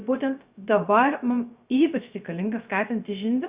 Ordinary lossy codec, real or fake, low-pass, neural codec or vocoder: Opus, 64 kbps; fake; 3.6 kHz; codec, 16 kHz, 0.3 kbps, FocalCodec